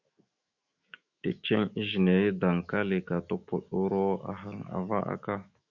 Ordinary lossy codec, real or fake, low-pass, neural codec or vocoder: Opus, 64 kbps; fake; 7.2 kHz; autoencoder, 48 kHz, 128 numbers a frame, DAC-VAE, trained on Japanese speech